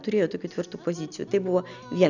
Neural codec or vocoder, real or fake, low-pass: none; real; 7.2 kHz